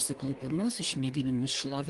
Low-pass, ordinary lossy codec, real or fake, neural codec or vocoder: 10.8 kHz; Opus, 24 kbps; fake; codec, 24 kHz, 1 kbps, SNAC